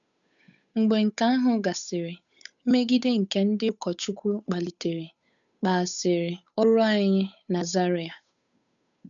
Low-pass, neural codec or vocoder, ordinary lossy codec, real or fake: 7.2 kHz; codec, 16 kHz, 8 kbps, FunCodec, trained on Chinese and English, 25 frames a second; none; fake